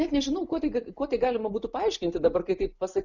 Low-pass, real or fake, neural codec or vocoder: 7.2 kHz; real; none